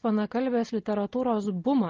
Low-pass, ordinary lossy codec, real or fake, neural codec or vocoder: 7.2 kHz; Opus, 16 kbps; real; none